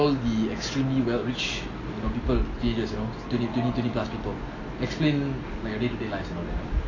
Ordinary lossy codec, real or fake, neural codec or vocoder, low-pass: AAC, 32 kbps; real; none; 7.2 kHz